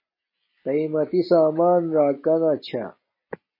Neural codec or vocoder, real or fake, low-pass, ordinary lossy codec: none; real; 5.4 kHz; MP3, 24 kbps